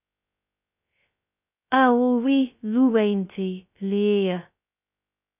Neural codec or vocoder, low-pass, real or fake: codec, 16 kHz, 0.2 kbps, FocalCodec; 3.6 kHz; fake